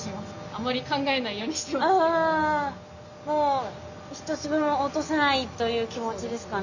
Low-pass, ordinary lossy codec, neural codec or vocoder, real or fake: 7.2 kHz; none; none; real